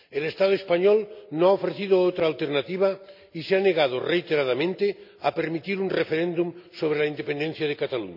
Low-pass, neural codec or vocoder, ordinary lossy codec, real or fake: 5.4 kHz; none; none; real